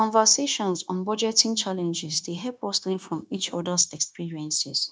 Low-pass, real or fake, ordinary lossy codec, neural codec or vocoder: none; fake; none; codec, 16 kHz, 0.9 kbps, LongCat-Audio-Codec